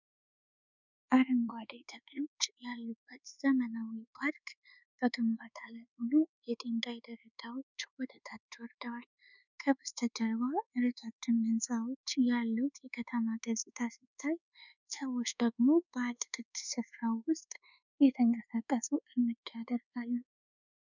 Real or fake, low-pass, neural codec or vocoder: fake; 7.2 kHz; codec, 24 kHz, 1.2 kbps, DualCodec